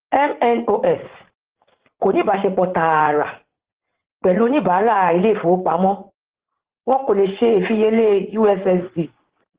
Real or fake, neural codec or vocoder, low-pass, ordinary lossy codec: fake; vocoder, 44.1 kHz, 80 mel bands, Vocos; 3.6 kHz; Opus, 16 kbps